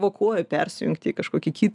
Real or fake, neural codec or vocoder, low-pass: real; none; 10.8 kHz